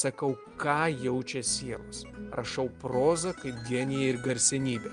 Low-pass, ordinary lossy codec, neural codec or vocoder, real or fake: 9.9 kHz; Opus, 32 kbps; none; real